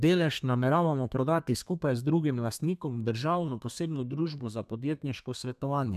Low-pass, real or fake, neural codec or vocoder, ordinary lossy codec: 14.4 kHz; fake; codec, 32 kHz, 1.9 kbps, SNAC; none